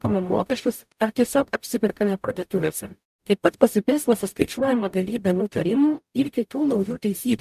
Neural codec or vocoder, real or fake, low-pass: codec, 44.1 kHz, 0.9 kbps, DAC; fake; 14.4 kHz